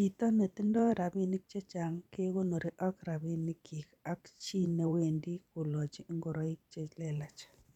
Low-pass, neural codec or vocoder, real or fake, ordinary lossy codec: 19.8 kHz; vocoder, 44.1 kHz, 128 mel bands every 512 samples, BigVGAN v2; fake; none